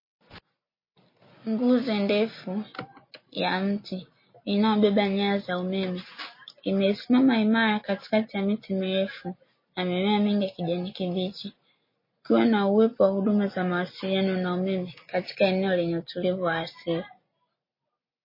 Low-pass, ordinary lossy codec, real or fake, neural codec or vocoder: 5.4 kHz; MP3, 24 kbps; real; none